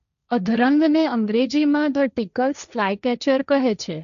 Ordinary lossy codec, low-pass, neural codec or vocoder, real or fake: none; 7.2 kHz; codec, 16 kHz, 1.1 kbps, Voila-Tokenizer; fake